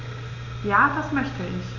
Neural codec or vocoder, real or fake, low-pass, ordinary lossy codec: none; real; 7.2 kHz; none